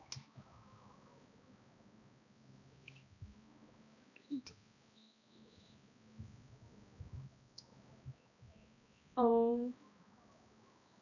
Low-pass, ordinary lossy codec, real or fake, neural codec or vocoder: 7.2 kHz; none; fake; codec, 16 kHz, 1 kbps, X-Codec, HuBERT features, trained on balanced general audio